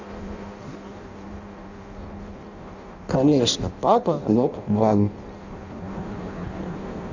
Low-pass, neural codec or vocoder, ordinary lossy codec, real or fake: 7.2 kHz; codec, 16 kHz in and 24 kHz out, 0.6 kbps, FireRedTTS-2 codec; none; fake